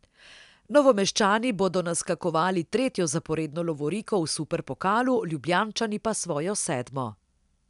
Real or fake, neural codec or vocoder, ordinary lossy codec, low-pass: real; none; none; 10.8 kHz